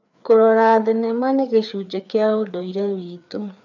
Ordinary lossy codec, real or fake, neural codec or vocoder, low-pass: none; fake; codec, 16 kHz, 4 kbps, FreqCodec, larger model; 7.2 kHz